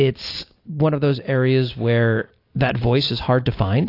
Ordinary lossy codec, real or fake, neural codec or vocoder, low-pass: AAC, 32 kbps; real; none; 5.4 kHz